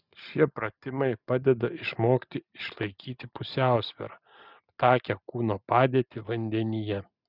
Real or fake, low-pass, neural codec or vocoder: real; 5.4 kHz; none